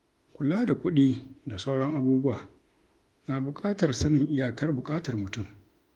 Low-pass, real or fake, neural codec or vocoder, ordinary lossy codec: 19.8 kHz; fake; autoencoder, 48 kHz, 32 numbers a frame, DAC-VAE, trained on Japanese speech; Opus, 32 kbps